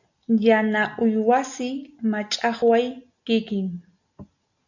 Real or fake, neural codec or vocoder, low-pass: real; none; 7.2 kHz